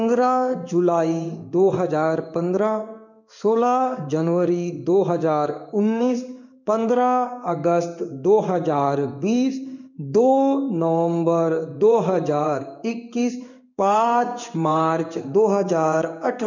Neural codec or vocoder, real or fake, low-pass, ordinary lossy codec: codec, 16 kHz in and 24 kHz out, 1 kbps, XY-Tokenizer; fake; 7.2 kHz; none